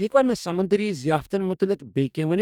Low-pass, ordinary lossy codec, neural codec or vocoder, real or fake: 19.8 kHz; none; codec, 44.1 kHz, 2.6 kbps, DAC; fake